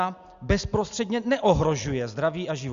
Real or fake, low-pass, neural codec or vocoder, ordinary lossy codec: real; 7.2 kHz; none; Opus, 64 kbps